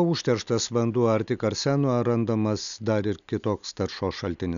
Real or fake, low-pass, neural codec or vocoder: real; 7.2 kHz; none